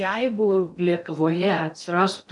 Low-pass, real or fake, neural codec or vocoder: 10.8 kHz; fake; codec, 16 kHz in and 24 kHz out, 0.6 kbps, FocalCodec, streaming, 2048 codes